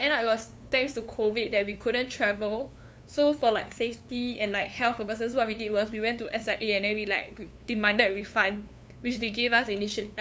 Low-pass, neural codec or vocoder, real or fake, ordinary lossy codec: none; codec, 16 kHz, 2 kbps, FunCodec, trained on LibriTTS, 25 frames a second; fake; none